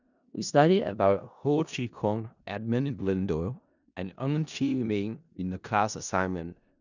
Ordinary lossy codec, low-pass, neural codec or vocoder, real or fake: none; 7.2 kHz; codec, 16 kHz in and 24 kHz out, 0.4 kbps, LongCat-Audio-Codec, four codebook decoder; fake